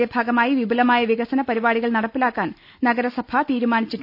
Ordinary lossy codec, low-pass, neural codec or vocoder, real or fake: none; 5.4 kHz; none; real